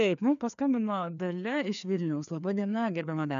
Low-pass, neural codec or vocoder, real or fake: 7.2 kHz; codec, 16 kHz, 2 kbps, FreqCodec, larger model; fake